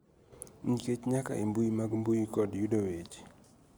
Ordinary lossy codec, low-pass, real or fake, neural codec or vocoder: none; none; real; none